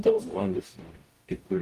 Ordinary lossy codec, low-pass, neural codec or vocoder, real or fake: Opus, 24 kbps; 14.4 kHz; codec, 44.1 kHz, 0.9 kbps, DAC; fake